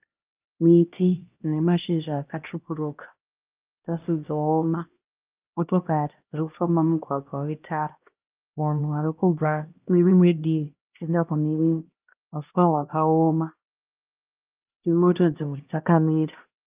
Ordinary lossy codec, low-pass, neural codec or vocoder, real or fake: Opus, 24 kbps; 3.6 kHz; codec, 16 kHz, 1 kbps, X-Codec, HuBERT features, trained on LibriSpeech; fake